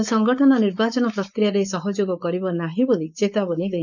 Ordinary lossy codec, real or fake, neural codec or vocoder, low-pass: none; fake; codec, 16 kHz, 4.8 kbps, FACodec; 7.2 kHz